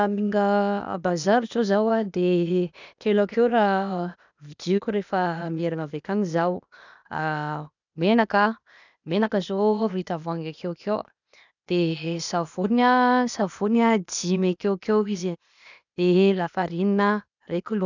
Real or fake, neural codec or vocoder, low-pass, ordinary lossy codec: fake; codec, 16 kHz, 0.8 kbps, ZipCodec; 7.2 kHz; none